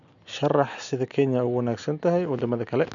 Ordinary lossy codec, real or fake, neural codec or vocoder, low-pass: none; real; none; 7.2 kHz